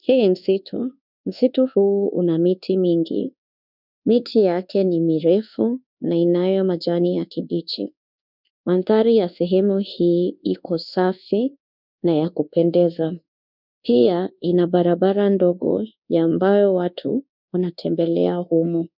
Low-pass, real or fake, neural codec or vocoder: 5.4 kHz; fake; codec, 24 kHz, 1.2 kbps, DualCodec